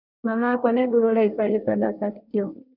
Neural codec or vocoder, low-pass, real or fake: codec, 24 kHz, 1 kbps, SNAC; 5.4 kHz; fake